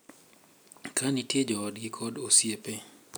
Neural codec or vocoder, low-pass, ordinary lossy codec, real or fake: none; none; none; real